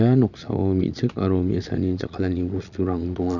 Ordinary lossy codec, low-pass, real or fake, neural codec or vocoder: none; 7.2 kHz; fake; vocoder, 22.05 kHz, 80 mel bands, Vocos